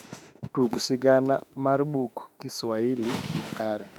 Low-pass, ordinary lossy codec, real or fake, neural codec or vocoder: 19.8 kHz; none; fake; autoencoder, 48 kHz, 32 numbers a frame, DAC-VAE, trained on Japanese speech